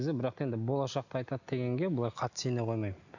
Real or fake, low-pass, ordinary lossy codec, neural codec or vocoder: real; 7.2 kHz; none; none